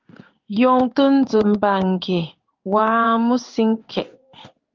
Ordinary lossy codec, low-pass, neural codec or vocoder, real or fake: Opus, 24 kbps; 7.2 kHz; codec, 16 kHz in and 24 kHz out, 1 kbps, XY-Tokenizer; fake